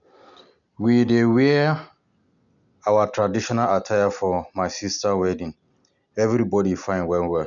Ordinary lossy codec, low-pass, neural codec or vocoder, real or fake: none; 7.2 kHz; none; real